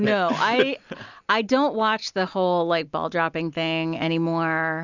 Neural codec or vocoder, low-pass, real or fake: none; 7.2 kHz; real